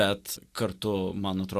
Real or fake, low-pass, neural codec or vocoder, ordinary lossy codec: real; 14.4 kHz; none; Opus, 64 kbps